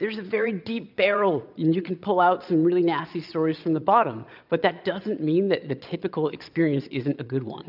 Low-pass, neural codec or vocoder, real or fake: 5.4 kHz; vocoder, 22.05 kHz, 80 mel bands, Vocos; fake